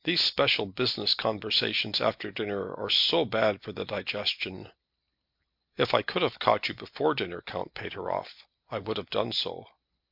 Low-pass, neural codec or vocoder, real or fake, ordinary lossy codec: 5.4 kHz; none; real; MP3, 48 kbps